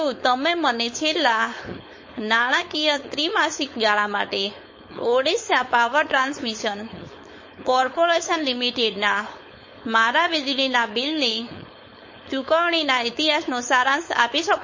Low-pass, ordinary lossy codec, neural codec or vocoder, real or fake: 7.2 kHz; MP3, 32 kbps; codec, 16 kHz, 4.8 kbps, FACodec; fake